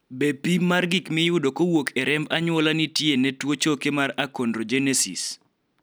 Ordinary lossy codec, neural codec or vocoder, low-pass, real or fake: none; none; none; real